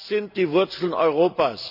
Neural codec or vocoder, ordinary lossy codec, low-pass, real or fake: none; none; 5.4 kHz; real